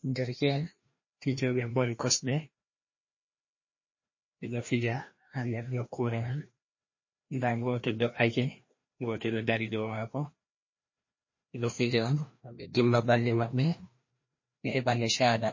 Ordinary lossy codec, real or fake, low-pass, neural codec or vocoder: MP3, 32 kbps; fake; 7.2 kHz; codec, 16 kHz, 1 kbps, FreqCodec, larger model